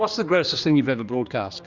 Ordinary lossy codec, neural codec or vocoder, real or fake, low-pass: Opus, 64 kbps; codec, 16 kHz, 2 kbps, X-Codec, HuBERT features, trained on general audio; fake; 7.2 kHz